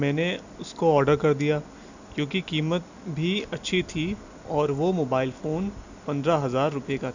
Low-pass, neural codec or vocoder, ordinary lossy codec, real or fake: 7.2 kHz; none; none; real